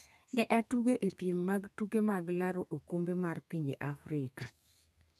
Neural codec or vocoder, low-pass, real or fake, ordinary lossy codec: codec, 32 kHz, 1.9 kbps, SNAC; 14.4 kHz; fake; none